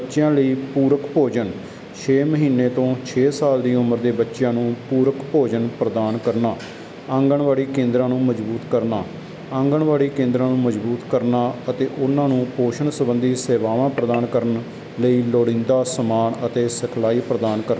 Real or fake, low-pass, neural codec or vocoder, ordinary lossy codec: real; none; none; none